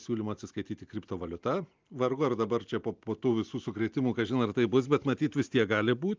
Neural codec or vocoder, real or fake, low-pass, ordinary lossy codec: none; real; 7.2 kHz; Opus, 24 kbps